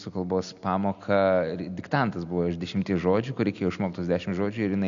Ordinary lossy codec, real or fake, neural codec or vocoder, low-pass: MP3, 96 kbps; real; none; 7.2 kHz